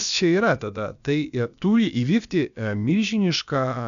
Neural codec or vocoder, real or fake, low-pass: codec, 16 kHz, about 1 kbps, DyCAST, with the encoder's durations; fake; 7.2 kHz